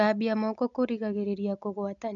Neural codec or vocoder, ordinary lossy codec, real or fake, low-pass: none; none; real; 7.2 kHz